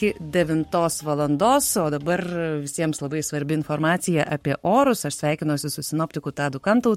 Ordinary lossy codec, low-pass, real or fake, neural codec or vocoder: MP3, 64 kbps; 19.8 kHz; fake; codec, 44.1 kHz, 7.8 kbps, Pupu-Codec